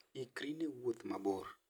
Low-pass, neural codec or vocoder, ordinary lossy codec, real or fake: none; none; none; real